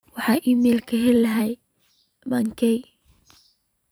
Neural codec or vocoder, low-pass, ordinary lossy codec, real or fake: vocoder, 44.1 kHz, 128 mel bands every 512 samples, BigVGAN v2; none; none; fake